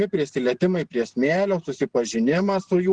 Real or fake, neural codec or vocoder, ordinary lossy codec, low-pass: real; none; Opus, 16 kbps; 9.9 kHz